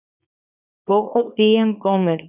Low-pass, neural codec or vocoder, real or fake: 3.6 kHz; codec, 24 kHz, 0.9 kbps, WavTokenizer, small release; fake